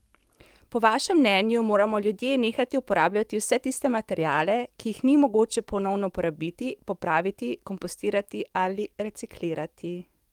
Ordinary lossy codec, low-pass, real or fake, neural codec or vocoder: Opus, 32 kbps; 19.8 kHz; fake; codec, 44.1 kHz, 7.8 kbps, DAC